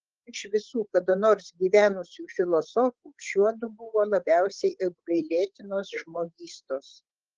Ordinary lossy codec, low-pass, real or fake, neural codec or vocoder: Opus, 16 kbps; 7.2 kHz; fake; codec, 16 kHz, 8 kbps, FreqCodec, larger model